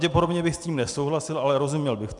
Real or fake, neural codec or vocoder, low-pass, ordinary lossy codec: real; none; 10.8 kHz; MP3, 96 kbps